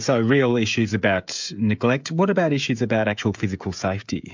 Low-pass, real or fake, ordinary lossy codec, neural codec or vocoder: 7.2 kHz; fake; MP3, 64 kbps; codec, 16 kHz, 16 kbps, FreqCodec, smaller model